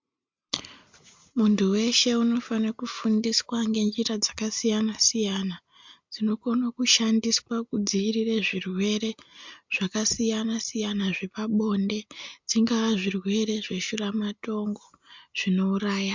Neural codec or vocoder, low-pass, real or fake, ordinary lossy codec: none; 7.2 kHz; real; MP3, 64 kbps